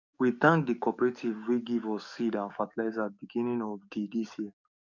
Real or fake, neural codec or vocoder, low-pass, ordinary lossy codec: fake; codec, 44.1 kHz, 7.8 kbps, DAC; 7.2 kHz; none